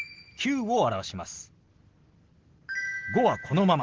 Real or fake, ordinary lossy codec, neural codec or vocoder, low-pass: real; Opus, 16 kbps; none; 7.2 kHz